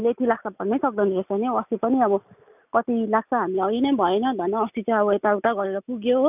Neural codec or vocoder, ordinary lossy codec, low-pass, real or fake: none; none; 3.6 kHz; real